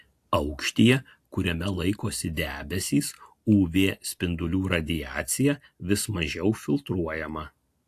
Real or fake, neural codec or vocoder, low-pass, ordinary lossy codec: real; none; 14.4 kHz; AAC, 64 kbps